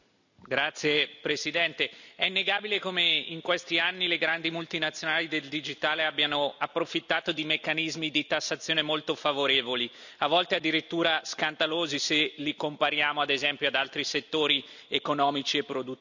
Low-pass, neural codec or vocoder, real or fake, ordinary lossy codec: 7.2 kHz; none; real; none